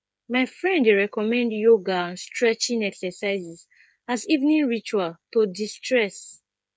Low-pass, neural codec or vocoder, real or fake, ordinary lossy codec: none; codec, 16 kHz, 8 kbps, FreqCodec, smaller model; fake; none